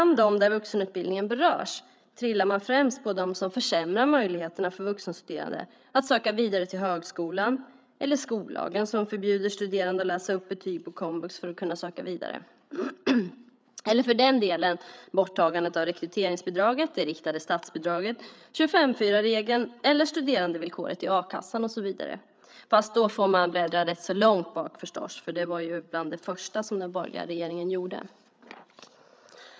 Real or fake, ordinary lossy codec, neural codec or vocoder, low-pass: fake; none; codec, 16 kHz, 8 kbps, FreqCodec, larger model; none